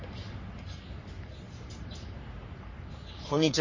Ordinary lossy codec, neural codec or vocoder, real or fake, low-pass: MP3, 32 kbps; codec, 44.1 kHz, 3.4 kbps, Pupu-Codec; fake; 7.2 kHz